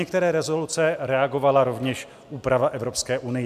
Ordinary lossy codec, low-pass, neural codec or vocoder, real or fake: MP3, 96 kbps; 14.4 kHz; none; real